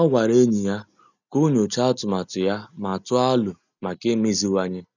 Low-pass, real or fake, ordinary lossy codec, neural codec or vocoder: 7.2 kHz; real; none; none